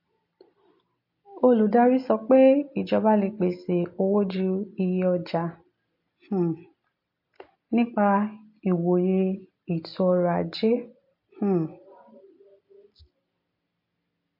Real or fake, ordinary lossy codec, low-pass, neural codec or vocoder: real; MP3, 32 kbps; 5.4 kHz; none